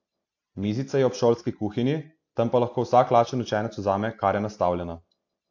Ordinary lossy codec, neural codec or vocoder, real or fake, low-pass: AAC, 48 kbps; none; real; 7.2 kHz